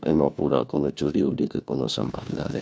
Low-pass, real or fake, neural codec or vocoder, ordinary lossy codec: none; fake; codec, 16 kHz, 1 kbps, FunCodec, trained on LibriTTS, 50 frames a second; none